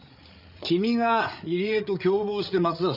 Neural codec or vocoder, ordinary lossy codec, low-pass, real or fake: codec, 16 kHz, 8 kbps, FreqCodec, larger model; none; 5.4 kHz; fake